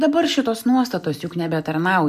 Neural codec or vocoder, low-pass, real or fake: none; 14.4 kHz; real